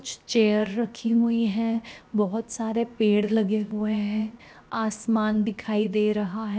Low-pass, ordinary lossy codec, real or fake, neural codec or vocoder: none; none; fake; codec, 16 kHz, 0.7 kbps, FocalCodec